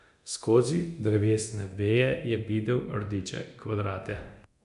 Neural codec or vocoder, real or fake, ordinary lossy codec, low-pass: codec, 24 kHz, 0.9 kbps, DualCodec; fake; AAC, 96 kbps; 10.8 kHz